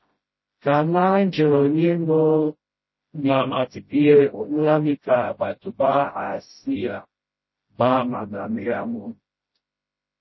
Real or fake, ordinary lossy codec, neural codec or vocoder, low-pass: fake; MP3, 24 kbps; codec, 16 kHz, 0.5 kbps, FreqCodec, smaller model; 7.2 kHz